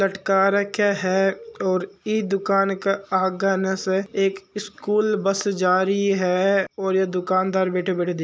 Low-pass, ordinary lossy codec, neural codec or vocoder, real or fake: none; none; none; real